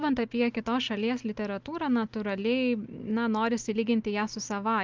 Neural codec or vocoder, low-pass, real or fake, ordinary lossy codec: none; 7.2 kHz; real; Opus, 24 kbps